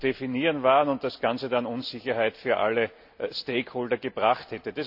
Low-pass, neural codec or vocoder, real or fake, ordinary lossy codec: 5.4 kHz; none; real; none